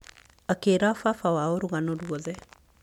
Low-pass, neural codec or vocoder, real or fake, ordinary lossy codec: 19.8 kHz; none; real; MP3, 96 kbps